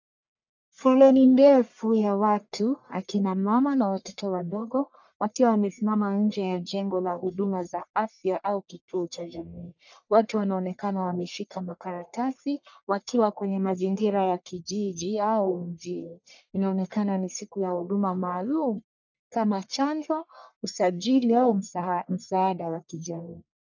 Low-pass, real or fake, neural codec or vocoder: 7.2 kHz; fake; codec, 44.1 kHz, 1.7 kbps, Pupu-Codec